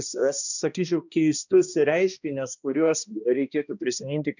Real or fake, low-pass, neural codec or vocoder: fake; 7.2 kHz; codec, 16 kHz, 1 kbps, X-Codec, HuBERT features, trained on balanced general audio